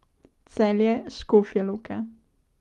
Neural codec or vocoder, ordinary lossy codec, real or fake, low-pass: none; Opus, 24 kbps; real; 14.4 kHz